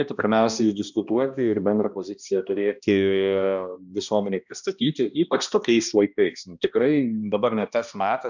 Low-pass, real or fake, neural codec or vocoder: 7.2 kHz; fake; codec, 16 kHz, 1 kbps, X-Codec, HuBERT features, trained on balanced general audio